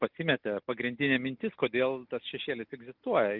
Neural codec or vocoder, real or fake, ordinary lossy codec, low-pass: none; real; Opus, 24 kbps; 5.4 kHz